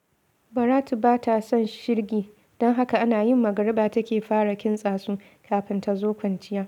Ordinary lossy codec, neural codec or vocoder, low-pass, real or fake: none; none; 19.8 kHz; real